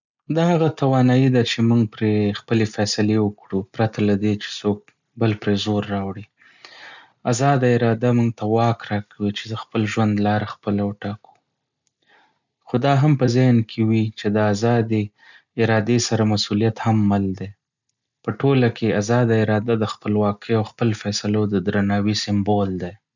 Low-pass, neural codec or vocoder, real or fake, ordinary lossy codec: 7.2 kHz; none; real; none